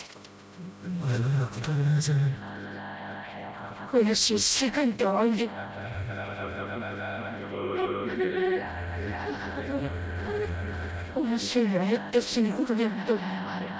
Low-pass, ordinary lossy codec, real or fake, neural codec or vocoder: none; none; fake; codec, 16 kHz, 0.5 kbps, FreqCodec, smaller model